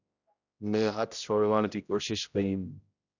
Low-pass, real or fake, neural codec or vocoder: 7.2 kHz; fake; codec, 16 kHz, 0.5 kbps, X-Codec, HuBERT features, trained on general audio